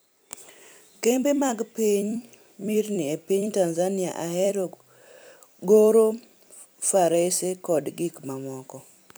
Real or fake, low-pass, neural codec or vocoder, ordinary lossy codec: fake; none; vocoder, 44.1 kHz, 128 mel bands every 256 samples, BigVGAN v2; none